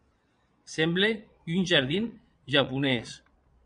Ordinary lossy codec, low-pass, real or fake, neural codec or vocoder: MP3, 64 kbps; 9.9 kHz; fake; vocoder, 22.05 kHz, 80 mel bands, Vocos